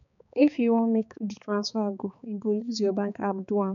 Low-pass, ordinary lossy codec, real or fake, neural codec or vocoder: 7.2 kHz; none; fake; codec, 16 kHz, 4 kbps, X-Codec, HuBERT features, trained on balanced general audio